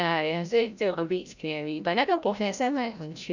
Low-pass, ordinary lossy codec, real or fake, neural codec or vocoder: 7.2 kHz; none; fake; codec, 16 kHz, 0.5 kbps, FreqCodec, larger model